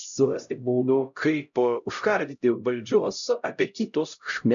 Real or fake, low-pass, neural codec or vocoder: fake; 7.2 kHz; codec, 16 kHz, 0.5 kbps, X-Codec, HuBERT features, trained on LibriSpeech